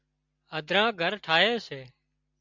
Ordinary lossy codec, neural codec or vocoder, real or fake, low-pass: MP3, 64 kbps; none; real; 7.2 kHz